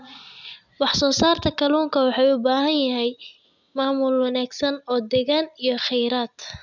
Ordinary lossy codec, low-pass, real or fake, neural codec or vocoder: none; 7.2 kHz; real; none